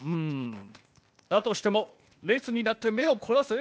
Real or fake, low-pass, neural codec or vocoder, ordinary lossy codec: fake; none; codec, 16 kHz, 0.8 kbps, ZipCodec; none